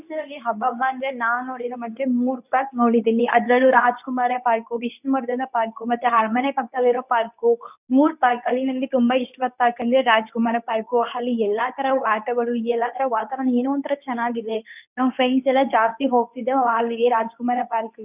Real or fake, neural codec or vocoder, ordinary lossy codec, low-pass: fake; codec, 24 kHz, 0.9 kbps, WavTokenizer, medium speech release version 2; none; 3.6 kHz